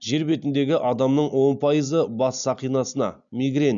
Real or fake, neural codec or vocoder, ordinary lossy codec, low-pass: real; none; MP3, 96 kbps; 7.2 kHz